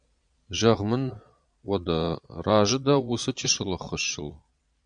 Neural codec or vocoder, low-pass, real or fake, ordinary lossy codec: vocoder, 22.05 kHz, 80 mel bands, Vocos; 9.9 kHz; fake; MP3, 96 kbps